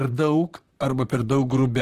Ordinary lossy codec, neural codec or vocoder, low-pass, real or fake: Opus, 16 kbps; vocoder, 44.1 kHz, 128 mel bands, Pupu-Vocoder; 14.4 kHz; fake